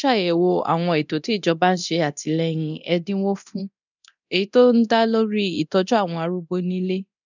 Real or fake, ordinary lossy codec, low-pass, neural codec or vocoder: fake; none; 7.2 kHz; codec, 24 kHz, 0.9 kbps, DualCodec